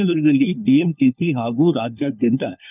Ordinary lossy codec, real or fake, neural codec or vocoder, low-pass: none; fake; codec, 16 kHz, 4 kbps, FunCodec, trained on LibriTTS, 50 frames a second; 3.6 kHz